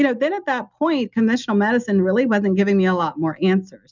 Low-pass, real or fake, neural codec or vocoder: 7.2 kHz; real; none